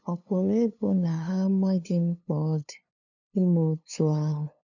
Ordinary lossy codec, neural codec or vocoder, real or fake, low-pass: none; codec, 16 kHz, 2 kbps, FunCodec, trained on LibriTTS, 25 frames a second; fake; 7.2 kHz